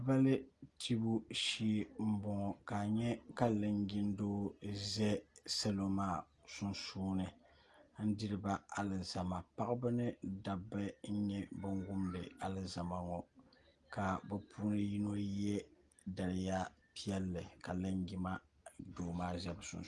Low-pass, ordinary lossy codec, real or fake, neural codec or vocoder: 10.8 kHz; Opus, 24 kbps; real; none